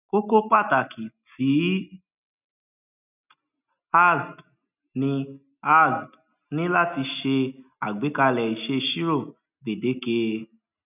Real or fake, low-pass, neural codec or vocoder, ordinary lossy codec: real; 3.6 kHz; none; none